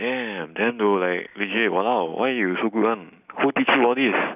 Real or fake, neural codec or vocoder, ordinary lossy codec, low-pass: real; none; none; 3.6 kHz